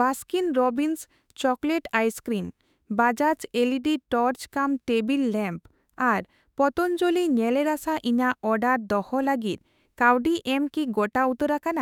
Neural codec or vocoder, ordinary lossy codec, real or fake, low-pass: autoencoder, 48 kHz, 32 numbers a frame, DAC-VAE, trained on Japanese speech; none; fake; 19.8 kHz